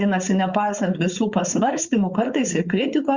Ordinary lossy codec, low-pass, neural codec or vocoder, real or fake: Opus, 64 kbps; 7.2 kHz; codec, 16 kHz, 4.8 kbps, FACodec; fake